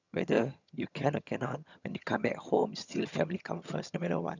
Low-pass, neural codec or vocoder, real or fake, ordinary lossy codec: 7.2 kHz; vocoder, 22.05 kHz, 80 mel bands, HiFi-GAN; fake; none